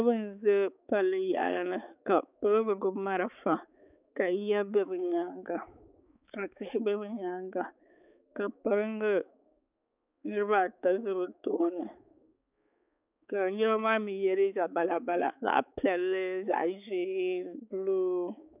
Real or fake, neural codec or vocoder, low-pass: fake; codec, 16 kHz, 4 kbps, X-Codec, HuBERT features, trained on balanced general audio; 3.6 kHz